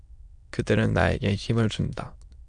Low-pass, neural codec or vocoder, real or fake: 9.9 kHz; autoencoder, 22.05 kHz, a latent of 192 numbers a frame, VITS, trained on many speakers; fake